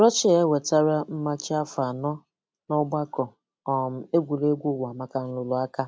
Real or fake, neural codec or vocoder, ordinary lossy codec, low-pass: real; none; none; none